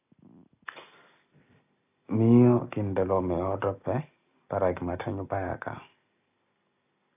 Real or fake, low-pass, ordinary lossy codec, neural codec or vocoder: real; 3.6 kHz; AAC, 32 kbps; none